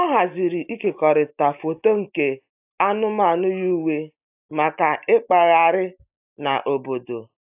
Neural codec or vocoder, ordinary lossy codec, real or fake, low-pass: none; AAC, 32 kbps; real; 3.6 kHz